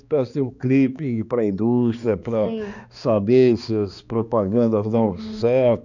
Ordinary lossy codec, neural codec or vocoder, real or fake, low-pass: none; codec, 16 kHz, 2 kbps, X-Codec, HuBERT features, trained on balanced general audio; fake; 7.2 kHz